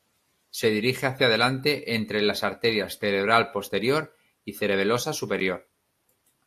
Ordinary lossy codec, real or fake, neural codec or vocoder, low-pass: AAC, 64 kbps; real; none; 14.4 kHz